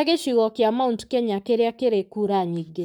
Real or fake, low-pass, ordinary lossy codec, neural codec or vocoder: fake; none; none; codec, 44.1 kHz, 7.8 kbps, Pupu-Codec